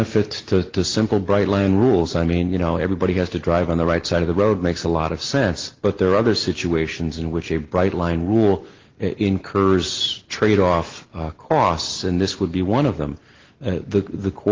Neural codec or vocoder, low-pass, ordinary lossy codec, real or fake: none; 7.2 kHz; Opus, 16 kbps; real